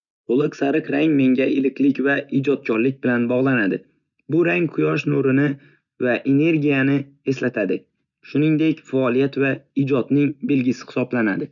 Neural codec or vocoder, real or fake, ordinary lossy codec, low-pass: none; real; none; 7.2 kHz